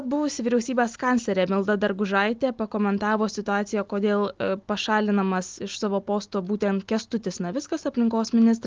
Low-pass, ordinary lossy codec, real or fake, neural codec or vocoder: 7.2 kHz; Opus, 32 kbps; real; none